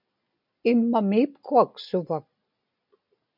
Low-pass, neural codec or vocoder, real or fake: 5.4 kHz; none; real